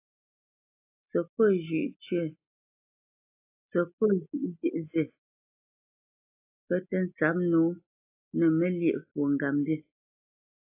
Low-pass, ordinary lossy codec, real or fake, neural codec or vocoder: 3.6 kHz; AAC, 32 kbps; real; none